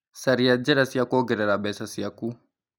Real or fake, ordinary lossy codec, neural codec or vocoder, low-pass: real; none; none; none